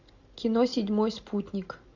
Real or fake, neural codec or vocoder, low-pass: real; none; 7.2 kHz